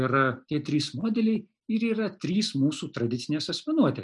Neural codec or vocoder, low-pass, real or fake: none; 10.8 kHz; real